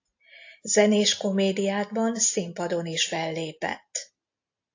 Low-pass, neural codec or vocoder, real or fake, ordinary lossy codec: 7.2 kHz; none; real; AAC, 48 kbps